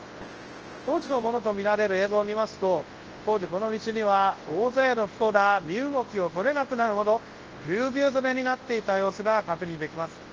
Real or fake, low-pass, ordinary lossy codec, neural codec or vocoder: fake; 7.2 kHz; Opus, 16 kbps; codec, 24 kHz, 0.9 kbps, WavTokenizer, large speech release